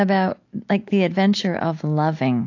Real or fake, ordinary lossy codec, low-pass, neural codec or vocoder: real; AAC, 48 kbps; 7.2 kHz; none